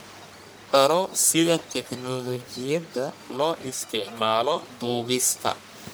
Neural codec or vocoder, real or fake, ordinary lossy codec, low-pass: codec, 44.1 kHz, 1.7 kbps, Pupu-Codec; fake; none; none